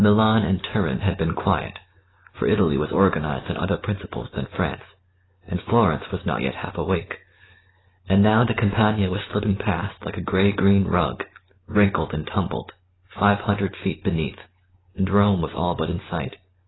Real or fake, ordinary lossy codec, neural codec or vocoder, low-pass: real; AAC, 16 kbps; none; 7.2 kHz